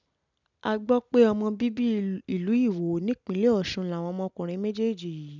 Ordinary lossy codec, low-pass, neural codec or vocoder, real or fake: none; 7.2 kHz; none; real